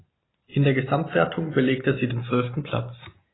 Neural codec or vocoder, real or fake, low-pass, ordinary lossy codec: none; real; 7.2 kHz; AAC, 16 kbps